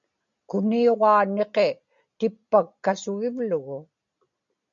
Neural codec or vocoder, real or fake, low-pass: none; real; 7.2 kHz